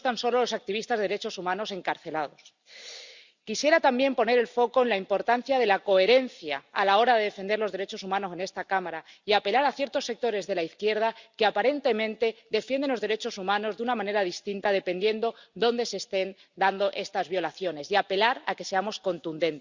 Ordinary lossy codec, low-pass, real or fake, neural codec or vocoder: Opus, 64 kbps; 7.2 kHz; real; none